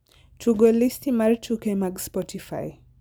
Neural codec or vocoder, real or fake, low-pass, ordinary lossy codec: none; real; none; none